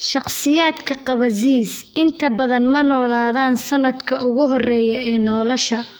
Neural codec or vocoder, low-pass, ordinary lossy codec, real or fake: codec, 44.1 kHz, 2.6 kbps, SNAC; none; none; fake